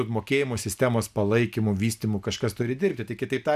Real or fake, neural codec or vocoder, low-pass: real; none; 14.4 kHz